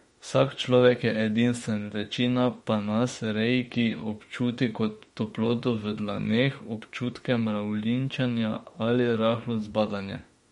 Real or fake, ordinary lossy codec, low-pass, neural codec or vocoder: fake; MP3, 48 kbps; 19.8 kHz; autoencoder, 48 kHz, 32 numbers a frame, DAC-VAE, trained on Japanese speech